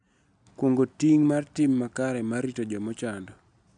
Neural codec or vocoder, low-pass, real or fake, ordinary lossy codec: none; 9.9 kHz; real; none